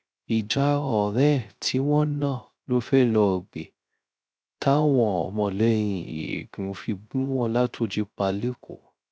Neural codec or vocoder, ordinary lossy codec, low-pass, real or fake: codec, 16 kHz, 0.3 kbps, FocalCodec; none; none; fake